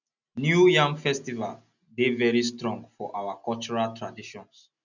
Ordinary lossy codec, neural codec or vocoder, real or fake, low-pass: none; none; real; 7.2 kHz